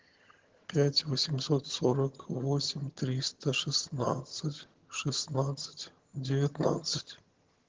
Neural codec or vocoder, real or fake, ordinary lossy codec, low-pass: vocoder, 22.05 kHz, 80 mel bands, HiFi-GAN; fake; Opus, 16 kbps; 7.2 kHz